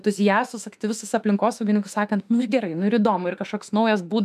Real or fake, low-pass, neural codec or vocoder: fake; 14.4 kHz; autoencoder, 48 kHz, 32 numbers a frame, DAC-VAE, trained on Japanese speech